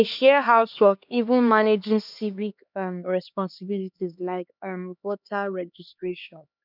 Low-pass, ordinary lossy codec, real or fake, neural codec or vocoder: 5.4 kHz; none; fake; codec, 16 kHz, 2 kbps, X-Codec, HuBERT features, trained on LibriSpeech